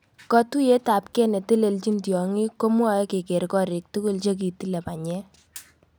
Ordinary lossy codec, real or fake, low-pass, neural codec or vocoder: none; real; none; none